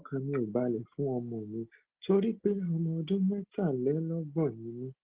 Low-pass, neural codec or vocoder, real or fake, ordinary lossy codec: 3.6 kHz; none; real; Opus, 16 kbps